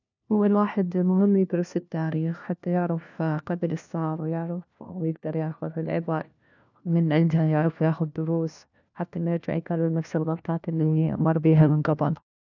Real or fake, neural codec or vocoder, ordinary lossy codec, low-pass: fake; codec, 16 kHz, 1 kbps, FunCodec, trained on LibriTTS, 50 frames a second; none; 7.2 kHz